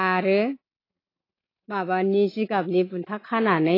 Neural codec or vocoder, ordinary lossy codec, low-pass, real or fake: none; AAC, 24 kbps; 5.4 kHz; real